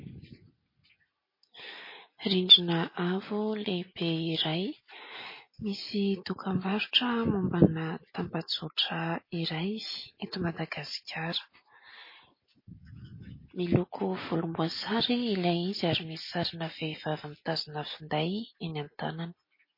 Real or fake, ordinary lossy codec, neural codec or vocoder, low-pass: real; MP3, 24 kbps; none; 5.4 kHz